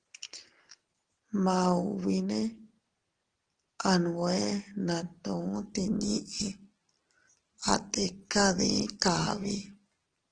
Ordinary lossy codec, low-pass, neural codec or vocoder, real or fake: Opus, 16 kbps; 9.9 kHz; none; real